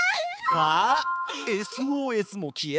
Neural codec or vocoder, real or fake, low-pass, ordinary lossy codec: codec, 16 kHz, 4 kbps, X-Codec, HuBERT features, trained on balanced general audio; fake; none; none